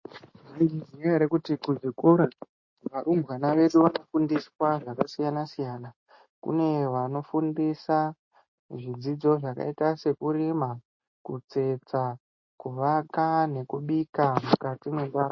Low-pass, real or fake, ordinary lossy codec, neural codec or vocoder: 7.2 kHz; real; MP3, 32 kbps; none